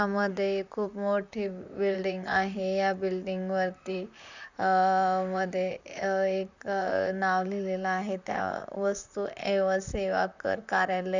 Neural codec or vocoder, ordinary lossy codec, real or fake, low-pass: vocoder, 44.1 kHz, 128 mel bands, Pupu-Vocoder; none; fake; 7.2 kHz